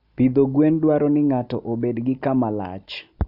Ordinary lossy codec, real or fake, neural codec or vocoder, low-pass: none; real; none; 5.4 kHz